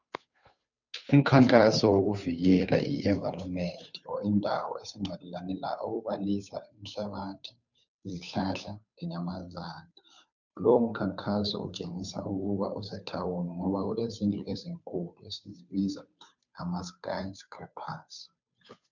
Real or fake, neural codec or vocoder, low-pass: fake; codec, 16 kHz, 2 kbps, FunCodec, trained on Chinese and English, 25 frames a second; 7.2 kHz